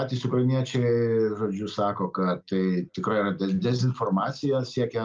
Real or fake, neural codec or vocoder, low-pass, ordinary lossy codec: real; none; 7.2 kHz; Opus, 24 kbps